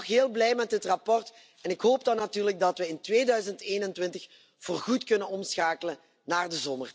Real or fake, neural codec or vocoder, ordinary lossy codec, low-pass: real; none; none; none